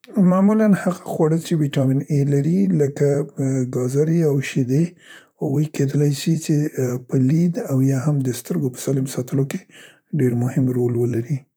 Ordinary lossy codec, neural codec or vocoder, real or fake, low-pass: none; vocoder, 44.1 kHz, 128 mel bands, Pupu-Vocoder; fake; none